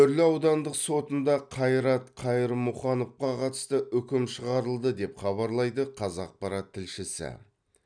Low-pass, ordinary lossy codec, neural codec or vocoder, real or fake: 9.9 kHz; none; none; real